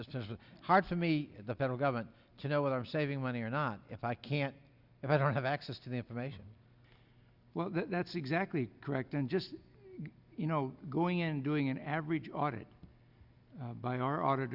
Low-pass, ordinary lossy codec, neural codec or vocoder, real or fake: 5.4 kHz; Opus, 64 kbps; none; real